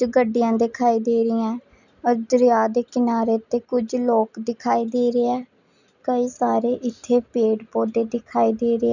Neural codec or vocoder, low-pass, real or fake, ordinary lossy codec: none; 7.2 kHz; real; none